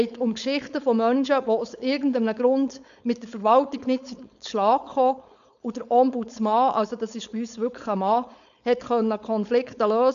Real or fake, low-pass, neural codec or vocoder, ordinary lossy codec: fake; 7.2 kHz; codec, 16 kHz, 4.8 kbps, FACodec; none